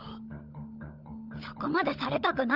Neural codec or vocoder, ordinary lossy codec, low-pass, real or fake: codec, 16 kHz, 16 kbps, FunCodec, trained on LibriTTS, 50 frames a second; Opus, 24 kbps; 5.4 kHz; fake